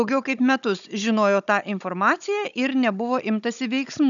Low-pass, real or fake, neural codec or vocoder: 7.2 kHz; fake; codec, 16 kHz, 16 kbps, FunCodec, trained on Chinese and English, 50 frames a second